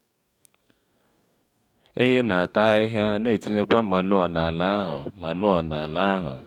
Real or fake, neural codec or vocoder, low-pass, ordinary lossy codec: fake; codec, 44.1 kHz, 2.6 kbps, DAC; 19.8 kHz; none